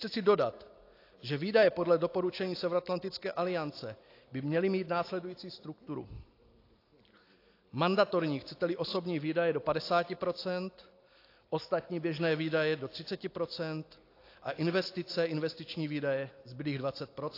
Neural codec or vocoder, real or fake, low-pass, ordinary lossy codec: none; real; 5.4 kHz; AAC, 32 kbps